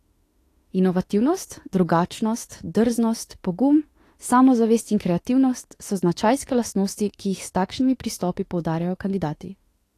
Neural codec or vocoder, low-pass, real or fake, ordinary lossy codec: autoencoder, 48 kHz, 32 numbers a frame, DAC-VAE, trained on Japanese speech; 14.4 kHz; fake; AAC, 48 kbps